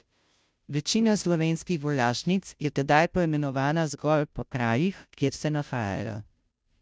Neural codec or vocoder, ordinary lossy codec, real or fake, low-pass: codec, 16 kHz, 0.5 kbps, FunCodec, trained on Chinese and English, 25 frames a second; none; fake; none